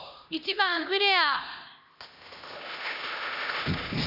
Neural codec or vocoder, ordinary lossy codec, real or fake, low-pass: codec, 16 kHz, 1 kbps, X-Codec, HuBERT features, trained on LibriSpeech; none; fake; 5.4 kHz